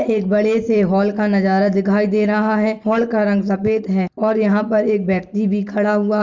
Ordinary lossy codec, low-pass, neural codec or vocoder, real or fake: Opus, 32 kbps; 7.2 kHz; none; real